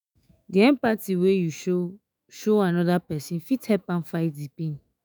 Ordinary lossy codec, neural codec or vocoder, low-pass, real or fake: none; autoencoder, 48 kHz, 128 numbers a frame, DAC-VAE, trained on Japanese speech; none; fake